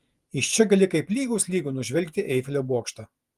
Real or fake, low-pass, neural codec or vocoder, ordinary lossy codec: fake; 14.4 kHz; vocoder, 48 kHz, 128 mel bands, Vocos; Opus, 32 kbps